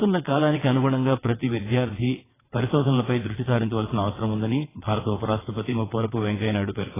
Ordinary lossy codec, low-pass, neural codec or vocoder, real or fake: AAC, 16 kbps; 3.6 kHz; vocoder, 22.05 kHz, 80 mel bands, WaveNeXt; fake